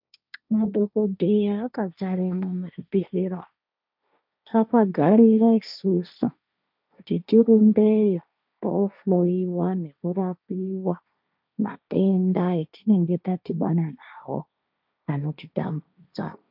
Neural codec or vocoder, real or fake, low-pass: codec, 16 kHz, 1.1 kbps, Voila-Tokenizer; fake; 5.4 kHz